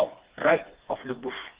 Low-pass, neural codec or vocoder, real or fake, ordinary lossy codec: 3.6 kHz; codec, 16 kHz, 2 kbps, FreqCodec, smaller model; fake; Opus, 16 kbps